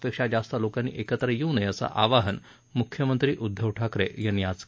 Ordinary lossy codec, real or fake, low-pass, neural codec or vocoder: none; real; none; none